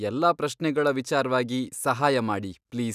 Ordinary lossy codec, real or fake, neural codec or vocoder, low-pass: none; real; none; 14.4 kHz